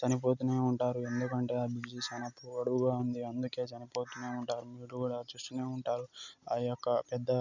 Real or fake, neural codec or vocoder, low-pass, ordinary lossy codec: real; none; 7.2 kHz; none